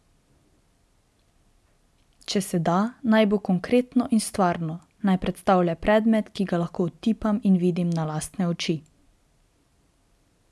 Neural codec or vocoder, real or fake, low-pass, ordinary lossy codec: none; real; none; none